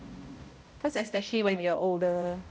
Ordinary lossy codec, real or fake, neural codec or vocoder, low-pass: none; fake; codec, 16 kHz, 0.5 kbps, X-Codec, HuBERT features, trained on balanced general audio; none